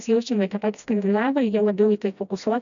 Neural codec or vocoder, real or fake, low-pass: codec, 16 kHz, 1 kbps, FreqCodec, smaller model; fake; 7.2 kHz